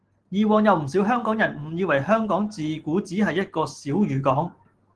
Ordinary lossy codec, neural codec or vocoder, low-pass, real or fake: Opus, 16 kbps; none; 10.8 kHz; real